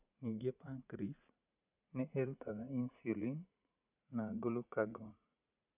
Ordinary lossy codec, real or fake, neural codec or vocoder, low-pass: none; fake; vocoder, 44.1 kHz, 80 mel bands, Vocos; 3.6 kHz